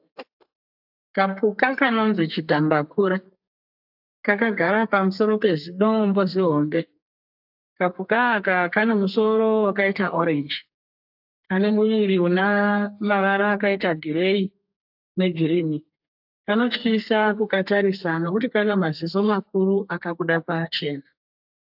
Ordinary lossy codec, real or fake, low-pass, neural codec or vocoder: AAC, 48 kbps; fake; 5.4 kHz; codec, 32 kHz, 1.9 kbps, SNAC